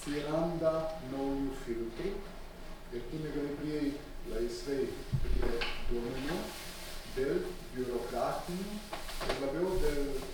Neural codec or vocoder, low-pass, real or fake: none; 19.8 kHz; real